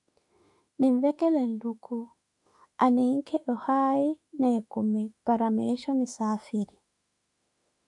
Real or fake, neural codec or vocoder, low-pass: fake; autoencoder, 48 kHz, 32 numbers a frame, DAC-VAE, trained on Japanese speech; 10.8 kHz